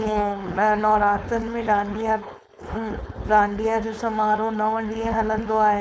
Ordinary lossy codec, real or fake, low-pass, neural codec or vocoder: none; fake; none; codec, 16 kHz, 4.8 kbps, FACodec